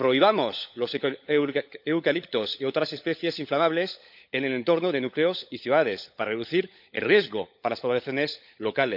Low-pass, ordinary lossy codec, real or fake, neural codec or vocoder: 5.4 kHz; none; fake; codec, 16 kHz, 16 kbps, FunCodec, trained on LibriTTS, 50 frames a second